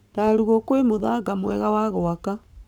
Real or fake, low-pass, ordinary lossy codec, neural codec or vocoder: fake; none; none; codec, 44.1 kHz, 7.8 kbps, Pupu-Codec